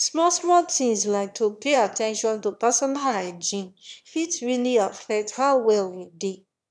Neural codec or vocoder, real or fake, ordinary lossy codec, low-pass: autoencoder, 22.05 kHz, a latent of 192 numbers a frame, VITS, trained on one speaker; fake; none; none